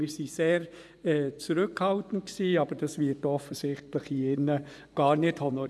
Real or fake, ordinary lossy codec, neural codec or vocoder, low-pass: fake; none; vocoder, 24 kHz, 100 mel bands, Vocos; none